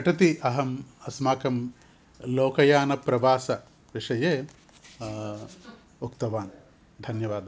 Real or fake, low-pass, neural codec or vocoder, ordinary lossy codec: real; none; none; none